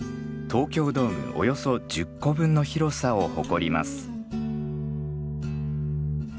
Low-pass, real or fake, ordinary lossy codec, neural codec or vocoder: none; real; none; none